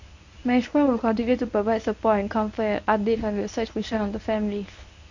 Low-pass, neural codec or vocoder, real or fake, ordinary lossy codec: 7.2 kHz; codec, 24 kHz, 0.9 kbps, WavTokenizer, medium speech release version 1; fake; none